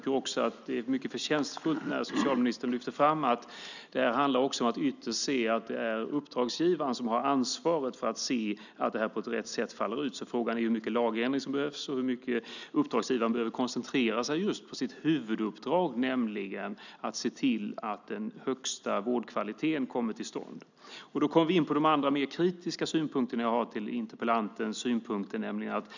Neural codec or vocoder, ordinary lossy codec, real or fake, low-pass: none; none; real; 7.2 kHz